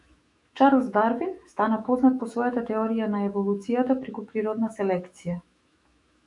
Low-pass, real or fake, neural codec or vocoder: 10.8 kHz; fake; autoencoder, 48 kHz, 128 numbers a frame, DAC-VAE, trained on Japanese speech